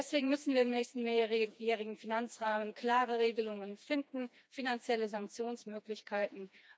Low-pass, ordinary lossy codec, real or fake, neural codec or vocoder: none; none; fake; codec, 16 kHz, 2 kbps, FreqCodec, smaller model